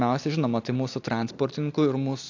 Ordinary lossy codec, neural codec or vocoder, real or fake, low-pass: AAC, 48 kbps; none; real; 7.2 kHz